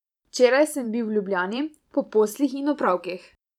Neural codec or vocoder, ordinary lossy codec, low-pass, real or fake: vocoder, 44.1 kHz, 128 mel bands every 512 samples, BigVGAN v2; none; 19.8 kHz; fake